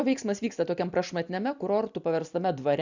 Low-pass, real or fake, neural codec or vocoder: 7.2 kHz; real; none